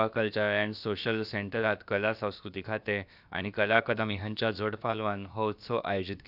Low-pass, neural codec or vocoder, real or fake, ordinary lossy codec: 5.4 kHz; codec, 16 kHz, about 1 kbps, DyCAST, with the encoder's durations; fake; none